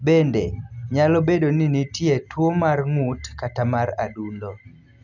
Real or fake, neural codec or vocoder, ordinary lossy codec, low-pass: real; none; none; 7.2 kHz